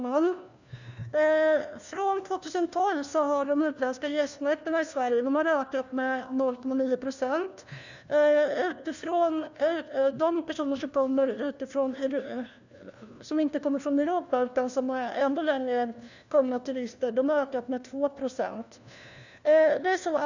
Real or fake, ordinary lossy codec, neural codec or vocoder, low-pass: fake; none; codec, 16 kHz, 1 kbps, FunCodec, trained on LibriTTS, 50 frames a second; 7.2 kHz